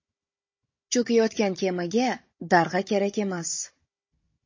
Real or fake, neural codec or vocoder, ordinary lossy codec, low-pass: fake; codec, 16 kHz, 16 kbps, FunCodec, trained on Chinese and English, 50 frames a second; MP3, 32 kbps; 7.2 kHz